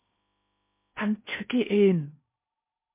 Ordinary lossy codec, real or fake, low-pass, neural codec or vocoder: MP3, 32 kbps; fake; 3.6 kHz; codec, 16 kHz in and 24 kHz out, 0.8 kbps, FocalCodec, streaming, 65536 codes